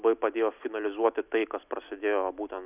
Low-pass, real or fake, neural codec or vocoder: 3.6 kHz; real; none